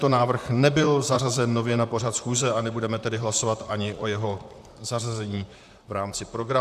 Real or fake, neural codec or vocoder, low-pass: fake; vocoder, 44.1 kHz, 128 mel bands, Pupu-Vocoder; 14.4 kHz